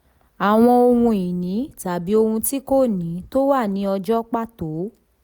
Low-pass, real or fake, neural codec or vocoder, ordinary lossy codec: none; real; none; none